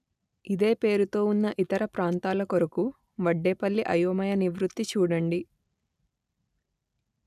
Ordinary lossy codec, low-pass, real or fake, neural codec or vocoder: none; 14.4 kHz; real; none